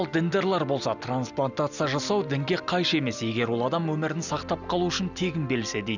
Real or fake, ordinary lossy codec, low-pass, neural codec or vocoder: real; none; 7.2 kHz; none